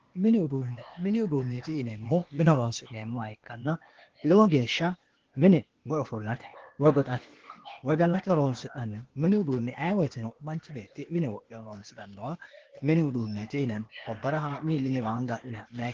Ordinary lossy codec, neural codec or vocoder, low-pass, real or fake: Opus, 16 kbps; codec, 16 kHz, 0.8 kbps, ZipCodec; 7.2 kHz; fake